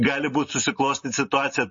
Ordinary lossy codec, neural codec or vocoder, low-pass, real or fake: MP3, 32 kbps; none; 7.2 kHz; real